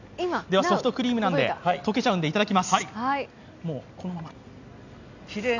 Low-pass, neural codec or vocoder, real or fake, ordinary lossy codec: 7.2 kHz; none; real; none